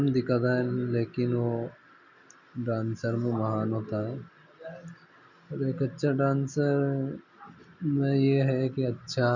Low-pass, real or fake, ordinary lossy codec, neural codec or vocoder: 7.2 kHz; real; none; none